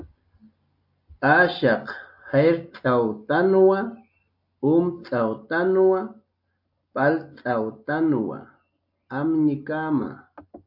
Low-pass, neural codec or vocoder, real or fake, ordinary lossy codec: 5.4 kHz; none; real; AAC, 48 kbps